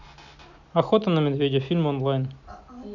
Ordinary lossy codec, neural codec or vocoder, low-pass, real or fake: none; none; 7.2 kHz; real